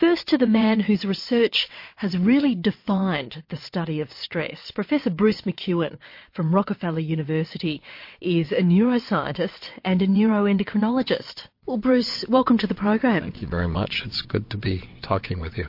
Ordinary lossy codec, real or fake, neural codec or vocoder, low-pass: MP3, 32 kbps; fake; vocoder, 22.05 kHz, 80 mel bands, WaveNeXt; 5.4 kHz